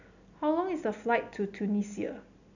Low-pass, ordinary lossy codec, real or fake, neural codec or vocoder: 7.2 kHz; none; real; none